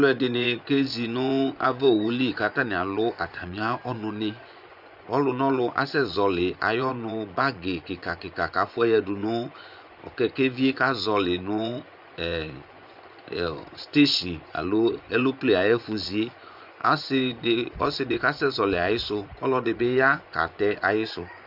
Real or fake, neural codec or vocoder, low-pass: fake; vocoder, 24 kHz, 100 mel bands, Vocos; 5.4 kHz